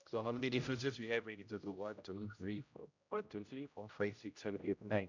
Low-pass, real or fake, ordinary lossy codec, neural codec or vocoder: 7.2 kHz; fake; none; codec, 16 kHz, 0.5 kbps, X-Codec, HuBERT features, trained on general audio